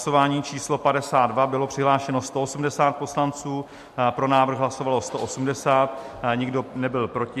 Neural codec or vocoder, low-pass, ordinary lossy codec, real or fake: none; 14.4 kHz; MP3, 64 kbps; real